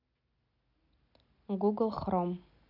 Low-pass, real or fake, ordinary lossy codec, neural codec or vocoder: 5.4 kHz; real; none; none